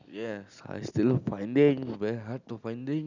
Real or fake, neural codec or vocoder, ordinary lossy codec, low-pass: real; none; none; 7.2 kHz